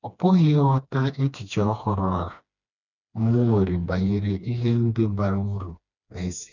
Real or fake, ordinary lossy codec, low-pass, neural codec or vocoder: fake; none; 7.2 kHz; codec, 16 kHz, 2 kbps, FreqCodec, smaller model